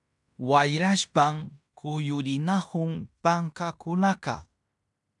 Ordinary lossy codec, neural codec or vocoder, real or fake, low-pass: MP3, 96 kbps; codec, 16 kHz in and 24 kHz out, 0.9 kbps, LongCat-Audio-Codec, fine tuned four codebook decoder; fake; 10.8 kHz